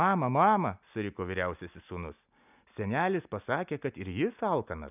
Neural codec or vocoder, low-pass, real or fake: none; 3.6 kHz; real